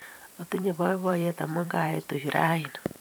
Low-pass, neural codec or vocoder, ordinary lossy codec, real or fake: none; vocoder, 44.1 kHz, 128 mel bands every 512 samples, BigVGAN v2; none; fake